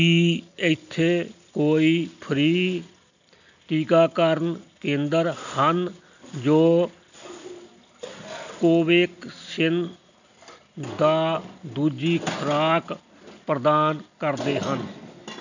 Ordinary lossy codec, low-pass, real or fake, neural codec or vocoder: none; 7.2 kHz; real; none